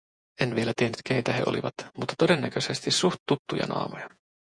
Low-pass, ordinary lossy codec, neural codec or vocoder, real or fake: 9.9 kHz; MP3, 96 kbps; vocoder, 48 kHz, 128 mel bands, Vocos; fake